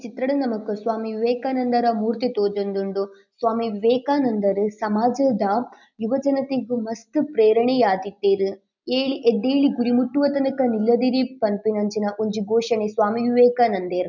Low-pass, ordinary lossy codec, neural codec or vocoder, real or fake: 7.2 kHz; none; none; real